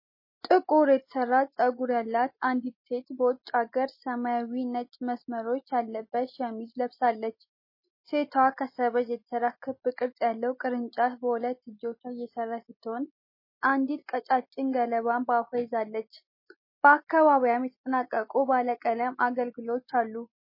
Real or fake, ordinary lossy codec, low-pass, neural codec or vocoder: real; MP3, 24 kbps; 5.4 kHz; none